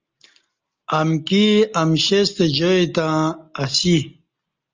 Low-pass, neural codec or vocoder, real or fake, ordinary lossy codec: 7.2 kHz; none; real; Opus, 32 kbps